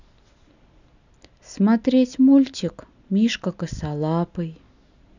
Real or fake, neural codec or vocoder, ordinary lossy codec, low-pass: real; none; none; 7.2 kHz